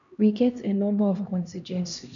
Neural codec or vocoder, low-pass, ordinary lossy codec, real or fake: codec, 16 kHz, 1 kbps, X-Codec, HuBERT features, trained on LibriSpeech; 7.2 kHz; none; fake